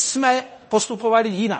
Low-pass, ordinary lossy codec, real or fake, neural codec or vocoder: 10.8 kHz; MP3, 32 kbps; fake; codec, 16 kHz in and 24 kHz out, 0.9 kbps, LongCat-Audio-Codec, fine tuned four codebook decoder